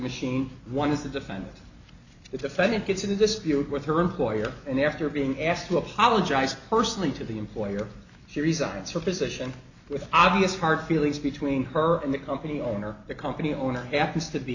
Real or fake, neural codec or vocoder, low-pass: real; none; 7.2 kHz